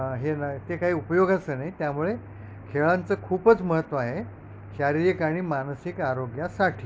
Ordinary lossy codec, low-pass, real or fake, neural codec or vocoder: none; none; real; none